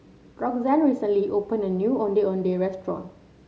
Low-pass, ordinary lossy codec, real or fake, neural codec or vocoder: none; none; real; none